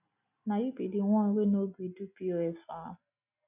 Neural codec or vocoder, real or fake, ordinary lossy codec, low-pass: none; real; none; 3.6 kHz